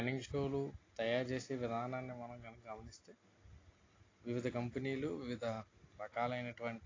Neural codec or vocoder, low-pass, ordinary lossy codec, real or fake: none; 7.2 kHz; AAC, 32 kbps; real